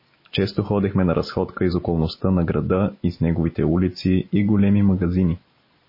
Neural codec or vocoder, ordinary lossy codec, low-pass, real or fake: none; MP3, 24 kbps; 5.4 kHz; real